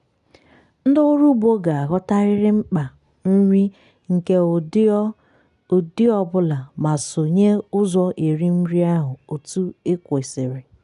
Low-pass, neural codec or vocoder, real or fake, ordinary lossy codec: 10.8 kHz; none; real; none